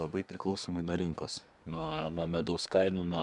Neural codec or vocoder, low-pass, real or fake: codec, 24 kHz, 1 kbps, SNAC; 10.8 kHz; fake